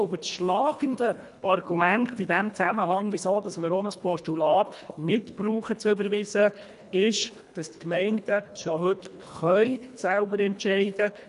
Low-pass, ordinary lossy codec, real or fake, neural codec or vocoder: 10.8 kHz; none; fake; codec, 24 kHz, 1.5 kbps, HILCodec